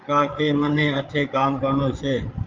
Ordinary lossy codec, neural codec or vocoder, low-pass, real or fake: Opus, 24 kbps; codec, 16 kHz, 8 kbps, FreqCodec, larger model; 7.2 kHz; fake